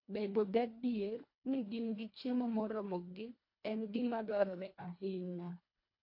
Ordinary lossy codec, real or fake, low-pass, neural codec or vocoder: MP3, 32 kbps; fake; 5.4 kHz; codec, 24 kHz, 1.5 kbps, HILCodec